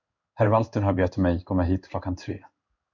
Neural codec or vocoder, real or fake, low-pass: codec, 16 kHz in and 24 kHz out, 1 kbps, XY-Tokenizer; fake; 7.2 kHz